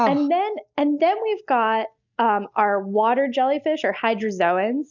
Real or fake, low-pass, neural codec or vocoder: real; 7.2 kHz; none